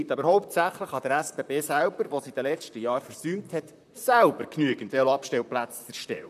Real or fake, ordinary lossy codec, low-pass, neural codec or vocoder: fake; none; 14.4 kHz; vocoder, 44.1 kHz, 128 mel bands, Pupu-Vocoder